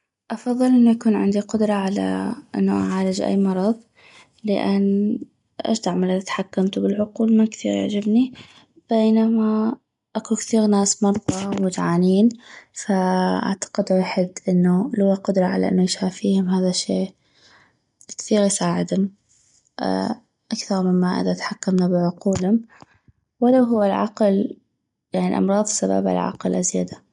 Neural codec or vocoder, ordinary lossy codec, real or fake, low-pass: none; MP3, 64 kbps; real; 10.8 kHz